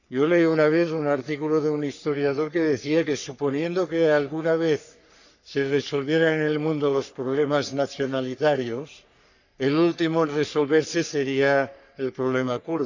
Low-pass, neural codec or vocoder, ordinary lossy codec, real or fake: 7.2 kHz; codec, 44.1 kHz, 3.4 kbps, Pupu-Codec; none; fake